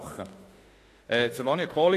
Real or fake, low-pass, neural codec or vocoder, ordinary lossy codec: fake; 14.4 kHz; autoencoder, 48 kHz, 32 numbers a frame, DAC-VAE, trained on Japanese speech; AAC, 48 kbps